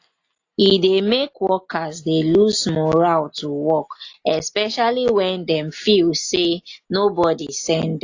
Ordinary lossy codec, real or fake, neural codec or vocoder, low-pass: AAC, 48 kbps; real; none; 7.2 kHz